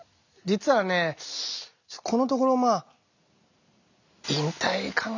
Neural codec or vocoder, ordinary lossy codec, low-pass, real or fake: none; none; 7.2 kHz; real